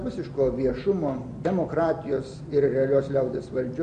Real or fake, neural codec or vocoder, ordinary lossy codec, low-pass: real; none; MP3, 48 kbps; 9.9 kHz